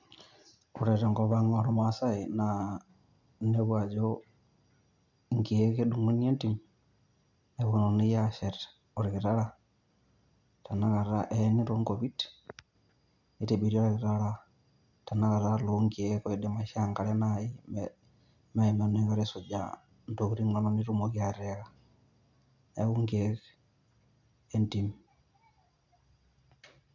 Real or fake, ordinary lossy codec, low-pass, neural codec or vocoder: real; none; 7.2 kHz; none